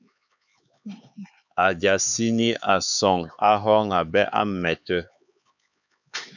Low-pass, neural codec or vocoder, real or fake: 7.2 kHz; codec, 16 kHz, 4 kbps, X-Codec, HuBERT features, trained on LibriSpeech; fake